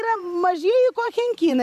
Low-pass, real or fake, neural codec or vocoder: 14.4 kHz; fake; vocoder, 44.1 kHz, 128 mel bands every 512 samples, BigVGAN v2